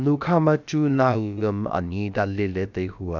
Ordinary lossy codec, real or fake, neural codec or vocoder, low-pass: none; fake; codec, 16 kHz, about 1 kbps, DyCAST, with the encoder's durations; 7.2 kHz